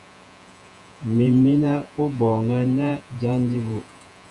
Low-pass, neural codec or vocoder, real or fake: 10.8 kHz; vocoder, 48 kHz, 128 mel bands, Vocos; fake